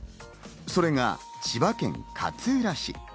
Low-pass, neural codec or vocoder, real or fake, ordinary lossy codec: none; none; real; none